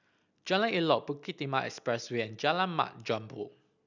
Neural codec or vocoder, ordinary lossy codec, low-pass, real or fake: none; none; 7.2 kHz; real